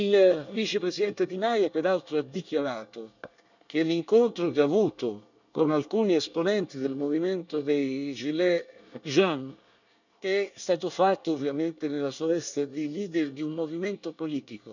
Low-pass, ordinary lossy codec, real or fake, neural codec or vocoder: 7.2 kHz; none; fake; codec, 24 kHz, 1 kbps, SNAC